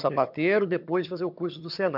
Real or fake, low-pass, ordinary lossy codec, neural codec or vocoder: fake; 5.4 kHz; none; vocoder, 22.05 kHz, 80 mel bands, HiFi-GAN